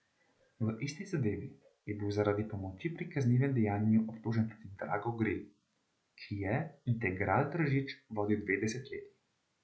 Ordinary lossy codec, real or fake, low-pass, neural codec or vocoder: none; real; none; none